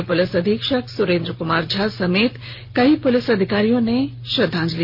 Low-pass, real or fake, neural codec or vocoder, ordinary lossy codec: 5.4 kHz; real; none; none